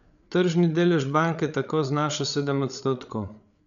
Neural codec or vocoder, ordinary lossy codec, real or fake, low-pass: codec, 16 kHz, 8 kbps, FreqCodec, larger model; none; fake; 7.2 kHz